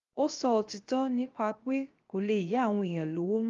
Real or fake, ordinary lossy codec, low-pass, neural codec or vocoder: fake; Opus, 32 kbps; 7.2 kHz; codec, 16 kHz, 0.7 kbps, FocalCodec